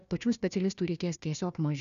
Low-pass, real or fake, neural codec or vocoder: 7.2 kHz; fake; codec, 16 kHz, 1 kbps, FunCodec, trained on Chinese and English, 50 frames a second